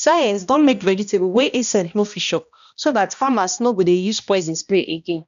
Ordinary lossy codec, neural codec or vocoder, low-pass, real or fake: none; codec, 16 kHz, 1 kbps, X-Codec, HuBERT features, trained on balanced general audio; 7.2 kHz; fake